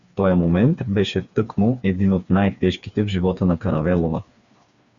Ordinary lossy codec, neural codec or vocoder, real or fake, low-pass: Opus, 64 kbps; codec, 16 kHz, 4 kbps, FreqCodec, smaller model; fake; 7.2 kHz